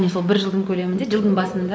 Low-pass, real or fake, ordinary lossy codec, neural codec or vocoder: none; real; none; none